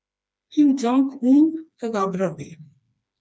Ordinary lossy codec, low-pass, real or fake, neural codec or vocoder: none; none; fake; codec, 16 kHz, 2 kbps, FreqCodec, smaller model